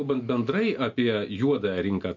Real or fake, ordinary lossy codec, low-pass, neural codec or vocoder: real; MP3, 48 kbps; 7.2 kHz; none